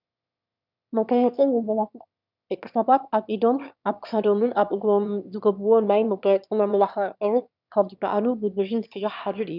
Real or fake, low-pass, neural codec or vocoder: fake; 5.4 kHz; autoencoder, 22.05 kHz, a latent of 192 numbers a frame, VITS, trained on one speaker